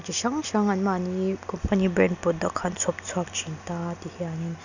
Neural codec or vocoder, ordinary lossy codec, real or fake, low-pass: none; none; real; 7.2 kHz